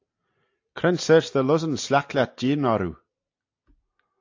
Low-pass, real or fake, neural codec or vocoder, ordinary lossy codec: 7.2 kHz; real; none; AAC, 48 kbps